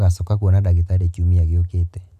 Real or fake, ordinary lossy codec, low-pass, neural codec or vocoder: real; none; 14.4 kHz; none